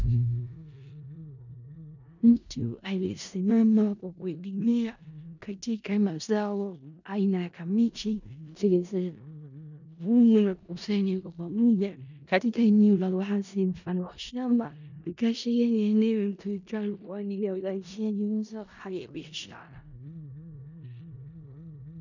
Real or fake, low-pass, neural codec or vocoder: fake; 7.2 kHz; codec, 16 kHz in and 24 kHz out, 0.4 kbps, LongCat-Audio-Codec, four codebook decoder